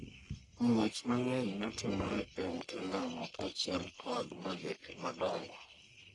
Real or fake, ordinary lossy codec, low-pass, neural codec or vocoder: fake; AAC, 32 kbps; 10.8 kHz; codec, 44.1 kHz, 1.7 kbps, Pupu-Codec